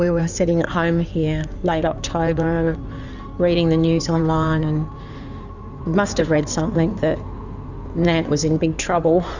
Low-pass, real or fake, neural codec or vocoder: 7.2 kHz; fake; codec, 16 kHz in and 24 kHz out, 2.2 kbps, FireRedTTS-2 codec